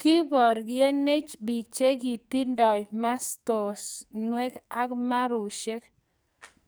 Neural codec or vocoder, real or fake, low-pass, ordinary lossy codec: codec, 44.1 kHz, 2.6 kbps, SNAC; fake; none; none